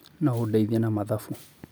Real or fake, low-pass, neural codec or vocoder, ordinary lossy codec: real; none; none; none